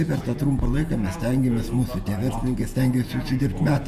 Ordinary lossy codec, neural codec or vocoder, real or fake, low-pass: Opus, 64 kbps; vocoder, 48 kHz, 128 mel bands, Vocos; fake; 14.4 kHz